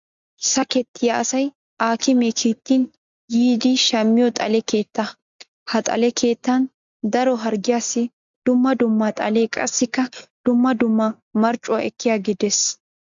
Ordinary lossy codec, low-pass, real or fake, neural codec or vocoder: MP3, 64 kbps; 7.2 kHz; real; none